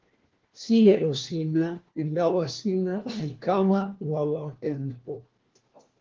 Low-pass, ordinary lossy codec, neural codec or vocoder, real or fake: 7.2 kHz; Opus, 16 kbps; codec, 16 kHz, 1 kbps, FunCodec, trained on LibriTTS, 50 frames a second; fake